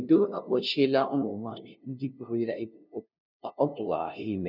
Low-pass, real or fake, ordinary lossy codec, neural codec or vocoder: 5.4 kHz; fake; none; codec, 16 kHz, 0.5 kbps, FunCodec, trained on LibriTTS, 25 frames a second